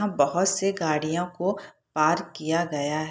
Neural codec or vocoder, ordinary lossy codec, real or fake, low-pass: none; none; real; none